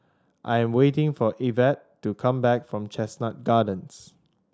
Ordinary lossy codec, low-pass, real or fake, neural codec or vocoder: none; none; real; none